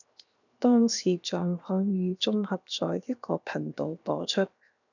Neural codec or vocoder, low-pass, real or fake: codec, 16 kHz, 0.7 kbps, FocalCodec; 7.2 kHz; fake